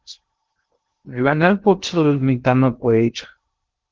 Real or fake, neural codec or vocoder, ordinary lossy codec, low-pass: fake; codec, 16 kHz in and 24 kHz out, 0.6 kbps, FocalCodec, streaming, 2048 codes; Opus, 16 kbps; 7.2 kHz